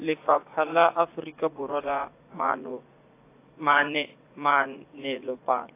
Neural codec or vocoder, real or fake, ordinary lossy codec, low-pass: vocoder, 44.1 kHz, 80 mel bands, Vocos; fake; AAC, 24 kbps; 3.6 kHz